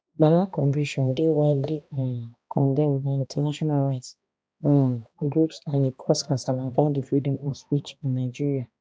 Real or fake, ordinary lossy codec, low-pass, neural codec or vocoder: fake; none; none; codec, 16 kHz, 1 kbps, X-Codec, HuBERT features, trained on balanced general audio